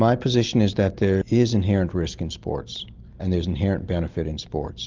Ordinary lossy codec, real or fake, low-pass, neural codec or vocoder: Opus, 32 kbps; real; 7.2 kHz; none